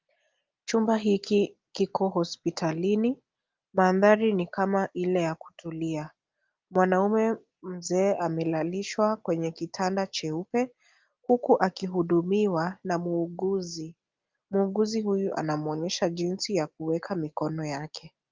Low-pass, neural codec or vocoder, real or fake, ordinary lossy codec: 7.2 kHz; none; real; Opus, 32 kbps